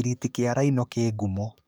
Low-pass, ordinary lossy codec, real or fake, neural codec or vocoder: none; none; fake; codec, 44.1 kHz, 7.8 kbps, DAC